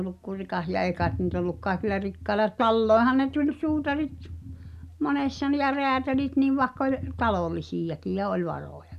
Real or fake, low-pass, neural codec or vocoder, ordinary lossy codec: real; 14.4 kHz; none; none